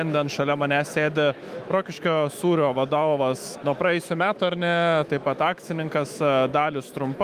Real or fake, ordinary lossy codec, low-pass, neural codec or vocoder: fake; Opus, 32 kbps; 14.4 kHz; autoencoder, 48 kHz, 128 numbers a frame, DAC-VAE, trained on Japanese speech